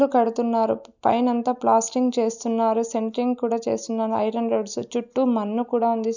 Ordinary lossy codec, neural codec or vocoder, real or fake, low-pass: none; none; real; 7.2 kHz